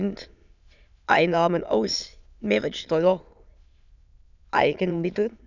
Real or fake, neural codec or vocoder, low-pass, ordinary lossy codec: fake; autoencoder, 22.05 kHz, a latent of 192 numbers a frame, VITS, trained on many speakers; 7.2 kHz; none